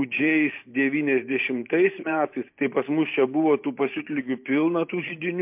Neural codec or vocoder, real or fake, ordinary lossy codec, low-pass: vocoder, 44.1 kHz, 128 mel bands every 512 samples, BigVGAN v2; fake; MP3, 32 kbps; 3.6 kHz